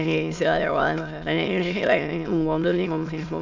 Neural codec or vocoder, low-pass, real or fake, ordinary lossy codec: autoencoder, 22.05 kHz, a latent of 192 numbers a frame, VITS, trained on many speakers; 7.2 kHz; fake; none